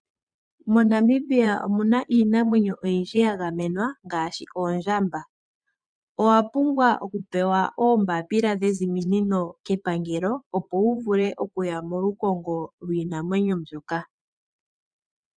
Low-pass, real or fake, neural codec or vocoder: 9.9 kHz; fake; vocoder, 22.05 kHz, 80 mel bands, Vocos